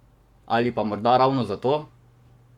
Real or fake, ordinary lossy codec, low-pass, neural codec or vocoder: fake; MP3, 96 kbps; 19.8 kHz; codec, 44.1 kHz, 7.8 kbps, Pupu-Codec